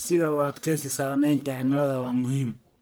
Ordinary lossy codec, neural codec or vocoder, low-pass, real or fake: none; codec, 44.1 kHz, 1.7 kbps, Pupu-Codec; none; fake